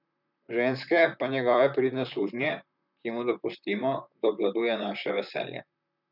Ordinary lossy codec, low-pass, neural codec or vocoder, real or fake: none; 5.4 kHz; vocoder, 44.1 kHz, 128 mel bands, Pupu-Vocoder; fake